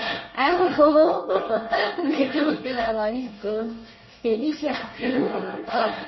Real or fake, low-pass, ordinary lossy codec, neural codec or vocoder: fake; 7.2 kHz; MP3, 24 kbps; codec, 24 kHz, 1 kbps, SNAC